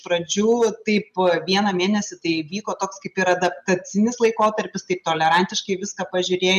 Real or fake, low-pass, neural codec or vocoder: real; 14.4 kHz; none